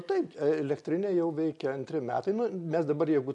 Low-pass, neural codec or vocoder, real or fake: 10.8 kHz; none; real